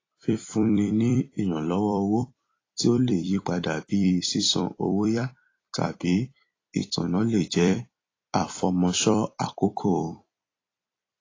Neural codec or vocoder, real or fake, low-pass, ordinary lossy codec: vocoder, 44.1 kHz, 128 mel bands every 256 samples, BigVGAN v2; fake; 7.2 kHz; AAC, 32 kbps